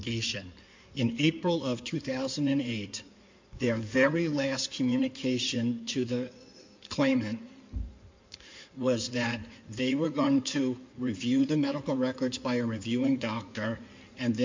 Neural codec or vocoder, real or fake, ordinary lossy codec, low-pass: codec, 16 kHz in and 24 kHz out, 2.2 kbps, FireRedTTS-2 codec; fake; MP3, 64 kbps; 7.2 kHz